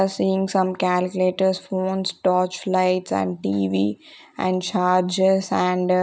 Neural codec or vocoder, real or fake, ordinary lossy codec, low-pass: none; real; none; none